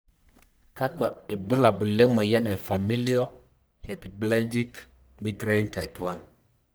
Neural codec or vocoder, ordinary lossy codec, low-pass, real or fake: codec, 44.1 kHz, 1.7 kbps, Pupu-Codec; none; none; fake